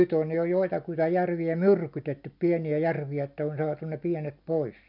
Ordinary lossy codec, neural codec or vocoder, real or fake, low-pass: none; none; real; 5.4 kHz